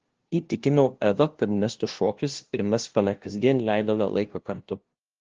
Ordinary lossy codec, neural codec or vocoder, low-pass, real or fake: Opus, 16 kbps; codec, 16 kHz, 0.5 kbps, FunCodec, trained on LibriTTS, 25 frames a second; 7.2 kHz; fake